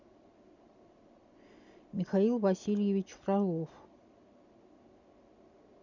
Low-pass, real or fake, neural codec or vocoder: 7.2 kHz; real; none